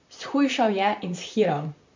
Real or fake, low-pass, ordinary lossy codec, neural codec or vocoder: fake; 7.2 kHz; MP3, 64 kbps; vocoder, 44.1 kHz, 128 mel bands, Pupu-Vocoder